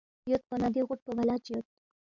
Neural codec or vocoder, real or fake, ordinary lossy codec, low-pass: none; real; MP3, 64 kbps; 7.2 kHz